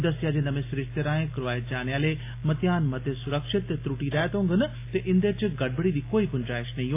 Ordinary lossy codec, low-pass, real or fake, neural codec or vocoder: AAC, 24 kbps; 3.6 kHz; real; none